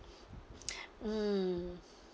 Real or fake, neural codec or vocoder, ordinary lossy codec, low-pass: real; none; none; none